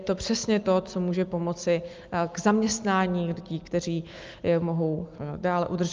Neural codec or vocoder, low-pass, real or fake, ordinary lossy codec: none; 7.2 kHz; real; Opus, 24 kbps